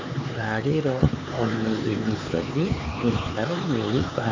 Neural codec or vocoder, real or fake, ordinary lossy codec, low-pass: codec, 16 kHz, 4 kbps, X-Codec, HuBERT features, trained on LibriSpeech; fake; MP3, 48 kbps; 7.2 kHz